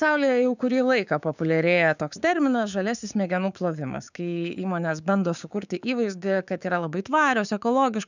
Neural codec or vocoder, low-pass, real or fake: codec, 16 kHz, 6 kbps, DAC; 7.2 kHz; fake